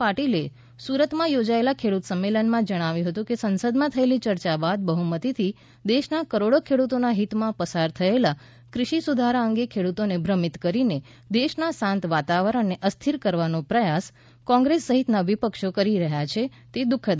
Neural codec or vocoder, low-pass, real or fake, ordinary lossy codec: none; none; real; none